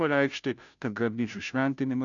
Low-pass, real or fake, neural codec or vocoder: 7.2 kHz; fake; codec, 16 kHz, 0.5 kbps, FunCodec, trained on Chinese and English, 25 frames a second